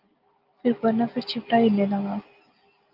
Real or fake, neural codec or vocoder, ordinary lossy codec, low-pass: real; none; Opus, 24 kbps; 5.4 kHz